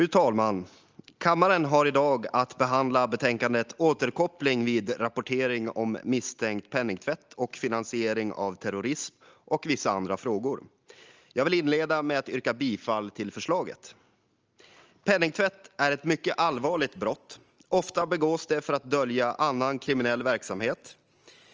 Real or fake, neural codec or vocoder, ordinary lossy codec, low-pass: real; none; Opus, 24 kbps; 7.2 kHz